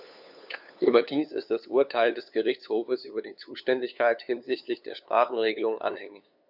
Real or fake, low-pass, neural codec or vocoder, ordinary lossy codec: fake; 5.4 kHz; codec, 16 kHz, 2 kbps, FunCodec, trained on LibriTTS, 25 frames a second; none